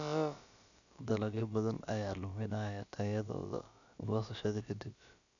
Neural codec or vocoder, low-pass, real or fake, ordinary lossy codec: codec, 16 kHz, about 1 kbps, DyCAST, with the encoder's durations; 7.2 kHz; fake; none